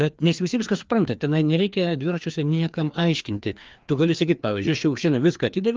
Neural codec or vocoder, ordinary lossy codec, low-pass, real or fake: codec, 16 kHz, 2 kbps, FreqCodec, larger model; Opus, 24 kbps; 7.2 kHz; fake